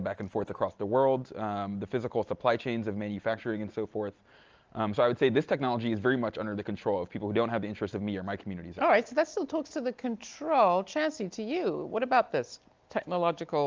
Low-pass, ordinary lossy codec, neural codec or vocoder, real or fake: 7.2 kHz; Opus, 24 kbps; none; real